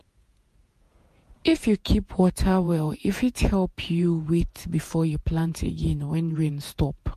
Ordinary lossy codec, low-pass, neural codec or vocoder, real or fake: MP3, 64 kbps; 14.4 kHz; vocoder, 48 kHz, 128 mel bands, Vocos; fake